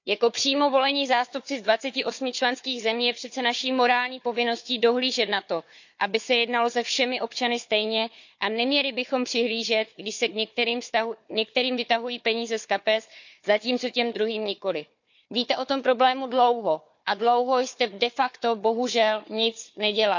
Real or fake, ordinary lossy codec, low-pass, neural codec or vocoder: fake; none; 7.2 kHz; codec, 16 kHz, 4 kbps, FunCodec, trained on Chinese and English, 50 frames a second